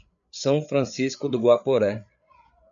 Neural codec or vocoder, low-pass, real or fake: codec, 16 kHz, 8 kbps, FreqCodec, larger model; 7.2 kHz; fake